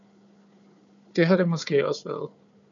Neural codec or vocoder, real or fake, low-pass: codec, 16 kHz, 4 kbps, FunCodec, trained on Chinese and English, 50 frames a second; fake; 7.2 kHz